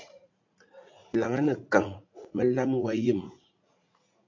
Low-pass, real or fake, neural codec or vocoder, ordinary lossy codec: 7.2 kHz; fake; vocoder, 44.1 kHz, 80 mel bands, Vocos; AAC, 48 kbps